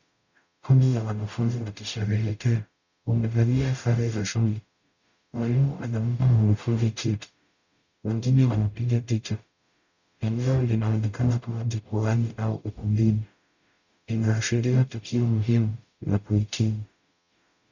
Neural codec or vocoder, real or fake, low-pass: codec, 44.1 kHz, 0.9 kbps, DAC; fake; 7.2 kHz